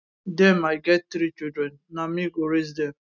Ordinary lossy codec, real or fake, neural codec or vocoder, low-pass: none; real; none; 7.2 kHz